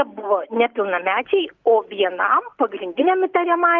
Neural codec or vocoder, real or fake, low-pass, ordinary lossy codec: none; real; 7.2 kHz; Opus, 32 kbps